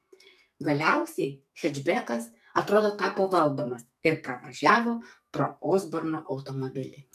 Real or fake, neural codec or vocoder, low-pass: fake; codec, 44.1 kHz, 2.6 kbps, SNAC; 14.4 kHz